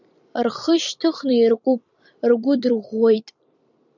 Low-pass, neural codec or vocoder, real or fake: 7.2 kHz; vocoder, 44.1 kHz, 128 mel bands every 256 samples, BigVGAN v2; fake